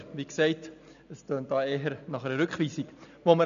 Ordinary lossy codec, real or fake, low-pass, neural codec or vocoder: none; real; 7.2 kHz; none